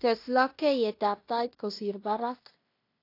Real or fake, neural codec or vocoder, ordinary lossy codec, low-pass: fake; codec, 16 kHz in and 24 kHz out, 0.9 kbps, LongCat-Audio-Codec, fine tuned four codebook decoder; AAC, 32 kbps; 5.4 kHz